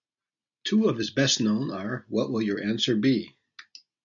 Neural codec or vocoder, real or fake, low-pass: none; real; 7.2 kHz